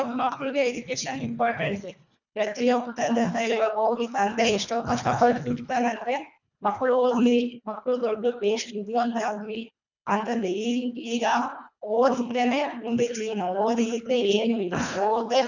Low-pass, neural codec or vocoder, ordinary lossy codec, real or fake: 7.2 kHz; codec, 24 kHz, 1.5 kbps, HILCodec; none; fake